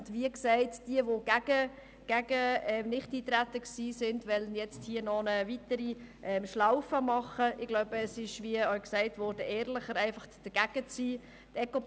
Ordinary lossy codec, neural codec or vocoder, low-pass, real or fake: none; none; none; real